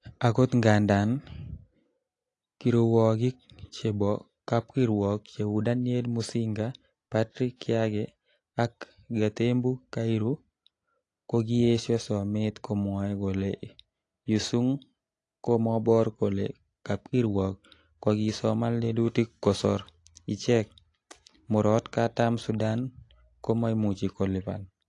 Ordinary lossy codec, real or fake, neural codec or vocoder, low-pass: AAC, 48 kbps; real; none; 10.8 kHz